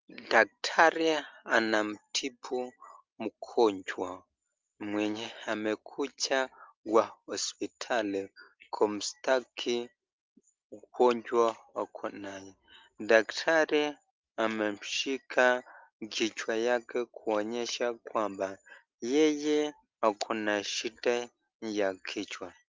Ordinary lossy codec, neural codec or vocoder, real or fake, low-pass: Opus, 32 kbps; none; real; 7.2 kHz